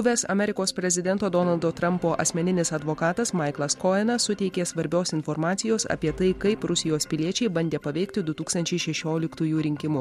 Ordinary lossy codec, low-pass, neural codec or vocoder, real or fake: MP3, 64 kbps; 19.8 kHz; none; real